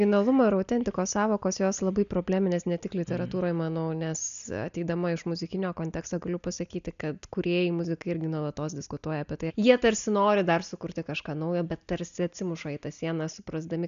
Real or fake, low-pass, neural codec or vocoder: real; 7.2 kHz; none